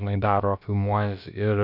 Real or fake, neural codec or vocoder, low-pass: fake; codec, 16 kHz, about 1 kbps, DyCAST, with the encoder's durations; 5.4 kHz